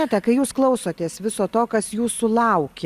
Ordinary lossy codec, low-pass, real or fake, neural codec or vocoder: Opus, 64 kbps; 14.4 kHz; real; none